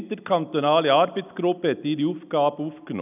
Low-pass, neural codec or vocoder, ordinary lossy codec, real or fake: 3.6 kHz; none; none; real